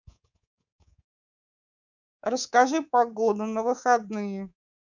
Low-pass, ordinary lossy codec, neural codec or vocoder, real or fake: 7.2 kHz; none; codec, 24 kHz, 3.1 kbps, DualCodec; fake